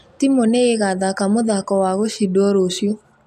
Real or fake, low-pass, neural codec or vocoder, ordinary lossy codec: real; 14.4 kHz; none; none